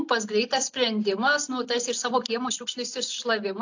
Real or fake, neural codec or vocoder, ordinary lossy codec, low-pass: real; none; AAC, 48 kbps; 7.2 kHz